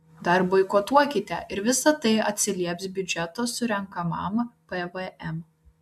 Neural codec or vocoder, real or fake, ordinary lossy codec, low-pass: none; real; AAC, 96 kbps; 14.4 kHz